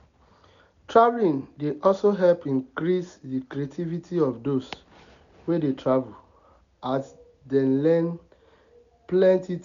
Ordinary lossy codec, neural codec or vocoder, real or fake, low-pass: none; none; real; 7.2 kHz